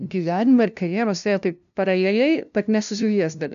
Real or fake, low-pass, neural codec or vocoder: fake; 7.2 kHz; codec, 16 kHz, 0.5 kbps, FunCodec, trained on LibriTTS, 25 frames a second